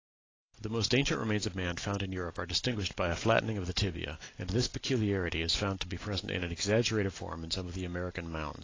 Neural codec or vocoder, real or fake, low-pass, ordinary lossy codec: none; real; 7.2 kHz; AAC, 32 kbps